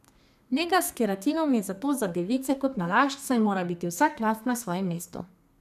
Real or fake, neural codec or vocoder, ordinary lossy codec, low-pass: fake; codec, 32 kHz, 1.9 kbps, SNAC; none; 14.4 kHz